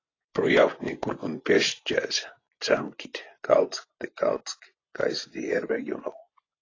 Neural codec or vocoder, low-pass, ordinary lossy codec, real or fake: vocoder, 24 kHz, 100 mel bands, Vocos; 7.2 kHz; AAC, 32 kbps; fake